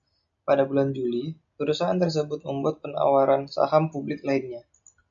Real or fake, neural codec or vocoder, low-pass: real; none; 7.2 kHz